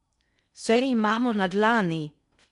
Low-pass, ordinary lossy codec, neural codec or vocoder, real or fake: 10.8 kHz; none; codec, 16 kHz in and 24 kHz out, 0.6 kbps, FocalCodec, streaming, 2048 codes; fake